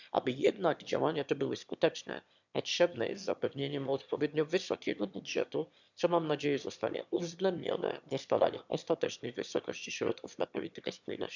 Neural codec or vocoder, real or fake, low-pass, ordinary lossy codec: autoencoder, 22.05 kHz, a latent of 192 numbers a frame, VITS, trained on one speaker; fake; 7.2 kHz; none